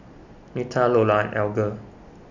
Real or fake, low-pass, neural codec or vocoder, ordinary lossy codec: real; 7.2 kHz; none; none